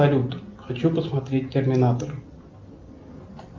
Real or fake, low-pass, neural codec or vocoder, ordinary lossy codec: real; 7.2 kHz; none; Opus, 24 kbps